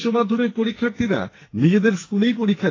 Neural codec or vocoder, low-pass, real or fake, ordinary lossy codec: codec, 44.1 kHz, 2.6 kbps, SNAC; 7.2 kHz; fake; AAC, 32 kbps